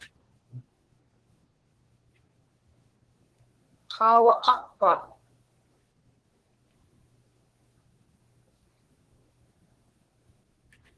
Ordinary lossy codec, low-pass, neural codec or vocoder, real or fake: Opus, 16 kbps; 10.8 kHz; codec, 24 kHz, 1 kbps, SNAC; fake